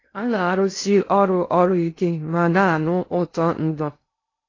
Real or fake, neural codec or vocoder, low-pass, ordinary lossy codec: fake; codec, 16 kHz in and 24 kHz out, 0.6 kbps, FocalCodec, streaming, 2048 codes; 7.2 kHz; AAC, 32 kbps